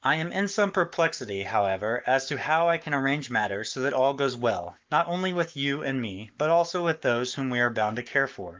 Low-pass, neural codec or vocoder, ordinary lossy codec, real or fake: 7.2 kHz; codec, 44.1 kHz, 7.8 kbps, Pupu-Codec; Opus, 32 kbps; fake